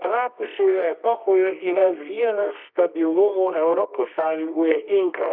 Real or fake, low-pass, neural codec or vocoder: fake; 5.4 kHz; codec, 24 kHz, 0.9 kbps, WavTokenizer, medium music audio release